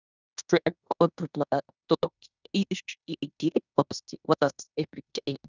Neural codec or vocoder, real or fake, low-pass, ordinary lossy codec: codec, 16 kHz in and 24 kHz out, 0.9 kbps, LongCat-Audio-Codec, fine tuned four codebook decoder; fake; 7.2 kHz; none